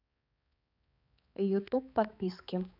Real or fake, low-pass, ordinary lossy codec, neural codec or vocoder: fake; 5.4 kHz; none; codec, 16 kHz, 4 kbps, X-Codec, HuBERT features, trained on balanced general audio